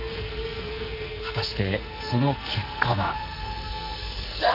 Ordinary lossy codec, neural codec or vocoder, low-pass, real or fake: none; codec, 44.1 kHz, 2.6 kbps, SNAC; 5.4 kHz; fake